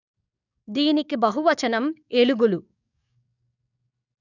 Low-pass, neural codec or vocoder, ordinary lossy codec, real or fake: 7.2 kHz; codec, 44.1 kHz, 7.8 kbps, DAC; none; fake